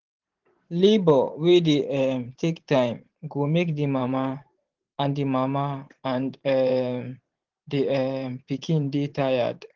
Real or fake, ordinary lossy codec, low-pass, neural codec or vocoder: fake; Opus, 16 kbps; 7.2 kHz; vocoder, 44.1 kHz, 128 mel bands every 512 samples, BigVGAN v2